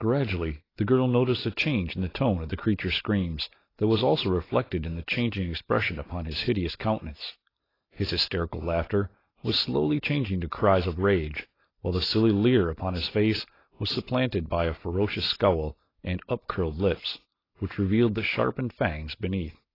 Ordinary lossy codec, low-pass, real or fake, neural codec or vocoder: AAC, 24 kbps; 5.4 kHz; real; none